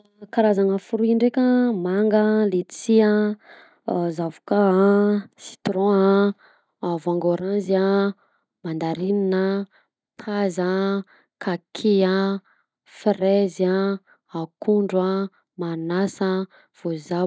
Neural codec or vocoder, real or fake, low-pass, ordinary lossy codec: none; real; none; none